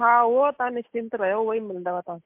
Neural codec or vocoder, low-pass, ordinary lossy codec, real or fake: none; 3.6 kHz; MP3, 32 kbps; real